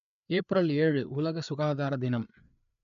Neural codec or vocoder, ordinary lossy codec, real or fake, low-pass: codec, 16 kHz, 4 kbps, FreqCodec, larger model; none; fake; 7.2 kHz